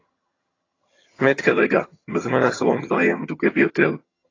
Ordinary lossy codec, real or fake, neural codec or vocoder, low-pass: AAC, 32 kbps; fake; vocoder, 22.05 kHz, 80 mel bands, HiFi-GAN; 7.2 kHz